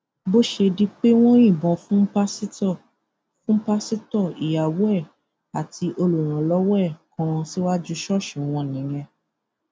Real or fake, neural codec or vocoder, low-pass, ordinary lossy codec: real; none; none; none